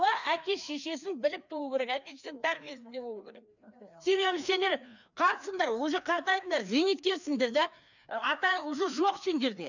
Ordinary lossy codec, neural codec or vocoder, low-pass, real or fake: none; codec, 16 kHz, 2 kbps, FreqCodec, larger model; 7.2 kHz; fake